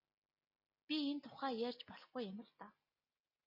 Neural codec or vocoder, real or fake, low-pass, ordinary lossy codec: none; real; 5.4 kHz; MP3, 24 kbps